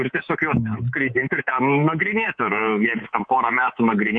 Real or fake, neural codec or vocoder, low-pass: fake; codec, 24 kHz, 3.1 kbps, DualCodec; 9.9 kHz